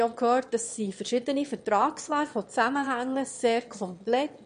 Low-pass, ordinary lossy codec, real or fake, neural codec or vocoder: 9.9 kHz; MP3, 48 kbps; fake; autoencoder, 22.05 kHz, a latent of 192 numbers a frame, VITS, trained on one speaker